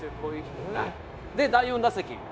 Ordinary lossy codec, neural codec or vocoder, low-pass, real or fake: none; codec, 16 kHz, 0.9 kbps, LongCat-Audio-Codec; none; fake